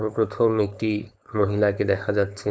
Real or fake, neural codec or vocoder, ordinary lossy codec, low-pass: fake; codec, 16 kHz, 4.8 kbps, FACodec; none; none